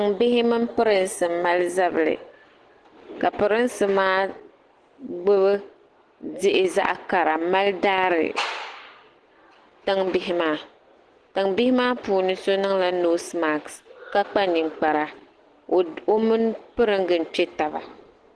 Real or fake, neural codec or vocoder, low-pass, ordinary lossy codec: real; none; 10.8 kHz; Opus, 16 kbps